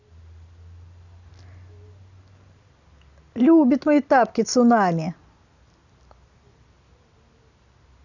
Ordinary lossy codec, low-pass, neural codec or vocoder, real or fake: none; 7.2 kHz; none; real